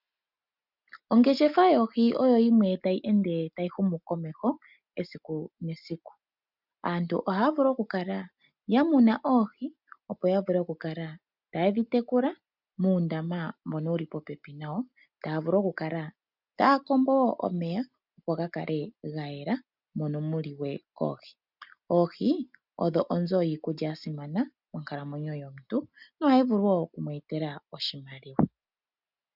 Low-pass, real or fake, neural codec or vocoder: 5.4 kHz; real; none